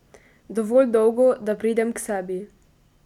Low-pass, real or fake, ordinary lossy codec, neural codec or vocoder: 19.8 kHz; real; none; none